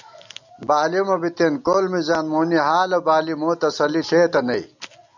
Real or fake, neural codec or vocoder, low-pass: real; none; 7.2 kHz